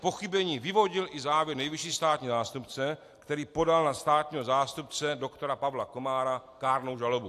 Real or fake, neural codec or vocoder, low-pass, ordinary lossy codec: real; none; 14.4 kHz; AAC, 64 kbps